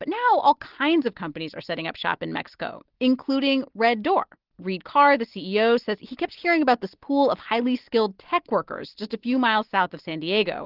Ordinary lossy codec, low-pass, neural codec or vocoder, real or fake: Opus, 16 kbps; 5.4 kHz; none; real